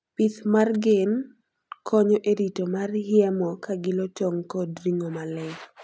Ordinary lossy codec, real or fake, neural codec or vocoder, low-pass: none; real; none; none